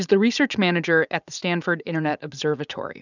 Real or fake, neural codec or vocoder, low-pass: fake; vocoder, 44.1 kHz, 80 mel bands, Vocos; 7.2 kHz